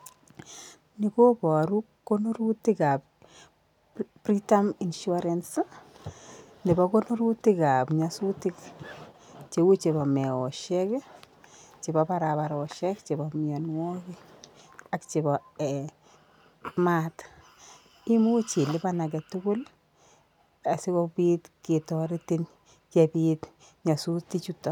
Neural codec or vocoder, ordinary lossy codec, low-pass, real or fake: none; none; 19.8 kHz; real